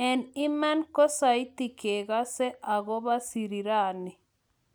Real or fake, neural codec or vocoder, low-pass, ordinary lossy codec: real; none; none; none